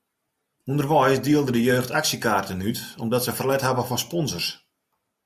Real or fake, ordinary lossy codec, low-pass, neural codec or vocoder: real; AAC, 96 kbps; 14.4 kHz; none